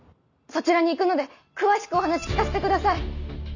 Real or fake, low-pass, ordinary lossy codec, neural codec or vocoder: real; 7.2 kHz; none; none